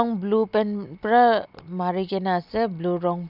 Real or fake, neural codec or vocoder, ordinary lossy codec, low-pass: real; none; none; 5.4 kHz